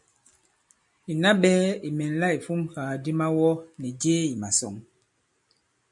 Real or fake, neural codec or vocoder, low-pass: real; none; 10.8 kHz